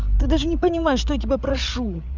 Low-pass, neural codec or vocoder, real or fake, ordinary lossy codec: 7.2 kHz; codec, 16 kHz, 8 kbps, FreqCodec, larger model; fake; none